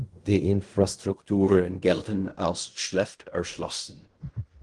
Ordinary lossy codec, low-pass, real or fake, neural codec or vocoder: Opus, 24 kbps; 10.8 kHz; fake; codec, 16 kHz in and 24 kHz out, 0.4 kbps, LongCat-Audio-Codec, fine tuned four codebook decoder